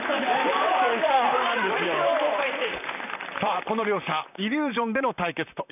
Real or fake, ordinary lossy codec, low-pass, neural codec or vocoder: fake; none; 3.6 kHz; vocoder, 44.1 kHz, 128 mel bands, Pupu-Vocoder